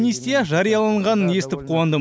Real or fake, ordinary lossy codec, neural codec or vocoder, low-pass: real; none; none; none